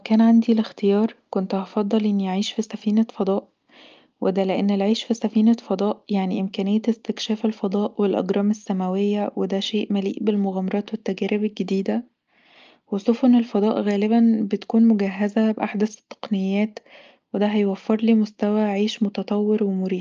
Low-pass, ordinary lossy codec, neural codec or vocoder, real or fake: 7.2 kHz; Opus, 24 kbps; none; real